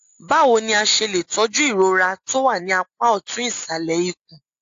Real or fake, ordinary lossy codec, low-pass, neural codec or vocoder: real; AAC, 48 kbps; 7.2 kHz; none